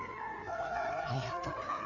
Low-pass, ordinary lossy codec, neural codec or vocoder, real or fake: 7.2 kHz; none; codec, 16 kHz, 4 kbps, FreqCodec, larger model; fake